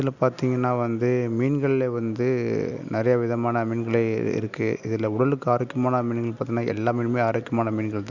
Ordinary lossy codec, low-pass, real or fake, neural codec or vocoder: none; 7.2 kHz; real; none